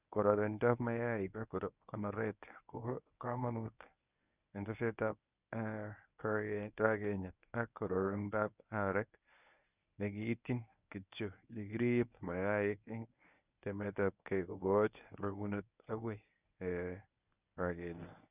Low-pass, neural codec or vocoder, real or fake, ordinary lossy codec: 3.6 kHz; codec, 24 kHz, 0.9 kbps, WavTokenizer, medium speech release version 1; fake; none